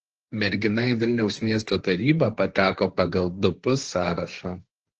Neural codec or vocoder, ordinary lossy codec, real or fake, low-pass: codec, 16 kHz, 1.1 kbps, Voila-Tokenizer; Opus, 16 kbps; fake; 7.2 kHz